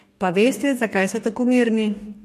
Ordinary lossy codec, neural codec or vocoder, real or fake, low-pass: MP3, 64 kbps; codec, 44.1 kHz, 2.6 kbps, DAC; fake; 14.4 kHz